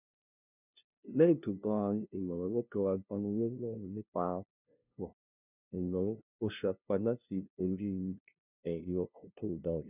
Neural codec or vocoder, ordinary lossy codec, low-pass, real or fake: codec, 16 kHz, 0.5 kbps, FunCodec, trained on LibriTTS, 25 frames a second; none; 3.6 kHz; fake